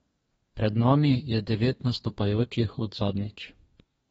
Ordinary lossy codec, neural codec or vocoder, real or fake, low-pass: AAC, 24 kbps; codec, 32 kHz, 1.9 kbps, SNAC; fake; 14.4 kHz